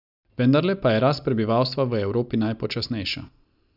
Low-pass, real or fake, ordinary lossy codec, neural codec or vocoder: 5.4 kHz; real; none; none